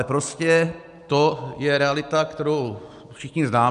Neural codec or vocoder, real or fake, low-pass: none; real; 10.8 kHz